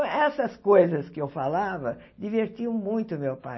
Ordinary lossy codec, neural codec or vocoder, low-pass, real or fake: MP3, 24 kbps; none; 7.2 kHz; real